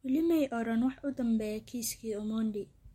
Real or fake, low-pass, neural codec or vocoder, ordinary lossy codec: real; 19.8 kHz; none; MP3, 64 kbps